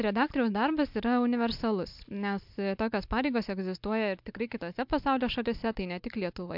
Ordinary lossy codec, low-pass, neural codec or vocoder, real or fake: MP3, 48 kbps; 5.4 kHz; none; real